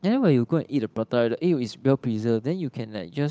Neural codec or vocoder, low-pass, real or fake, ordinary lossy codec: codec, 16 kHz, 8 kbps, FunCodec, trained on Chinese and English, 25 frames a second; none; fake; none